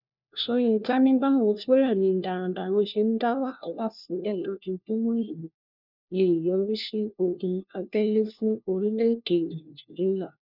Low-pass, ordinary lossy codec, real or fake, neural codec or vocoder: 5.4 kHz; none; fake; codec, 16 kHz, 1 kbps, FunCodec, trained on LibriTTS, 50 frames a second